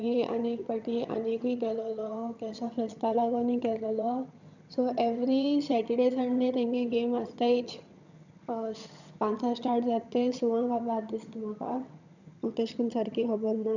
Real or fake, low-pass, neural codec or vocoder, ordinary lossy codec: fake; 7.2 kHz; vocoder, 22.05 kHz, 80 mel bands, HiFi-GAN; none